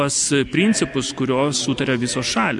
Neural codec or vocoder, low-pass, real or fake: none; 10.8 kHz; real